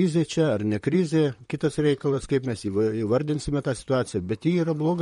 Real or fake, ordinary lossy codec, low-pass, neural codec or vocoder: fake; MP3, 48 kbps; 19.8 kHz; vocoder, 44.1 kHz, 128 mel bands, Pupu-Vocoder